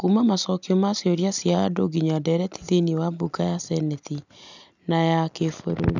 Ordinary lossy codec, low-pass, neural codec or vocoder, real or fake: none; 7.2 kHz; none; real